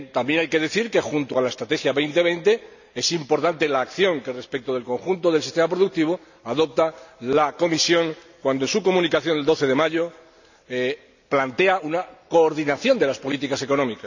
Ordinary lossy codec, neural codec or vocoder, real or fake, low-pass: none; none; real; 7.2 kHz